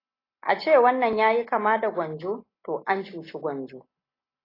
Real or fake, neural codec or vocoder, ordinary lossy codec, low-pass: real; none; AAC, 24 kbps; 5.4 kHz